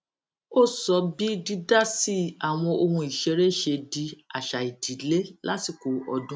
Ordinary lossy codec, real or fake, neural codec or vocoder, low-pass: none; real; none; none